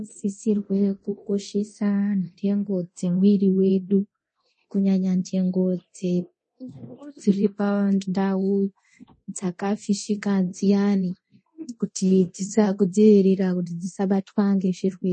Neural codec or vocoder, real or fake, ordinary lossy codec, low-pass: codec, 24 kHz, 0.9 kbps, DualCodec; fake; MP3, 32 kbps; 10.8 kHz